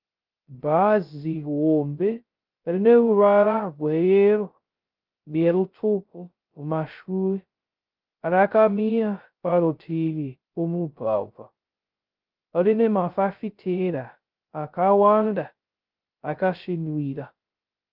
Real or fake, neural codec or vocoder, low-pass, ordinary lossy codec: fake; codec, 16 kHz, 0.2 kbps, FocalCodec; 5.4 kHz; Opus, 32 kbps